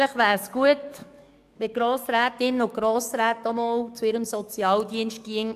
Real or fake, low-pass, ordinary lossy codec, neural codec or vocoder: fake; 14.4 kHz; AAC, 96 kbps; codec, 44.1 kHz, 7.8 kbps, DAC